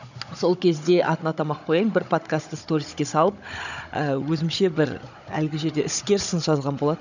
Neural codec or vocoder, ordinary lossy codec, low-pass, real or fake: codec, 16 kHz, 16 kbps, FunCodec, trained on Chinese and English, 50 frames a second; none; 7.2 kHz; fake